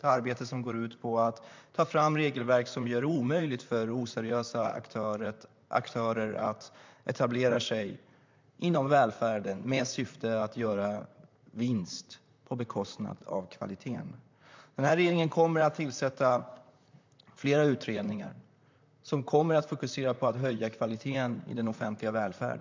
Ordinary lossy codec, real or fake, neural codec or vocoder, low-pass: MP3, 64 kbps; fake; vocoder, 44.1 kHz, 128 mel bands, Pupu-Vocoder; 7.2 kHz